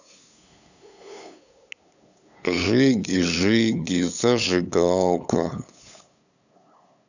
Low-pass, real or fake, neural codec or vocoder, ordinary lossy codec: 7.2 kHz; fake; codec, 16 kHz, 8 kbps, FunCodec, trained on LibriTTS, 25 frames a second; none